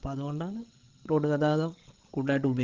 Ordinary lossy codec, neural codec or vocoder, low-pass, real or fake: Opus, 16 kbps; codec, 16 kHz, 16 kbps, FreqCodec, larger model; 7.2 kHz; fake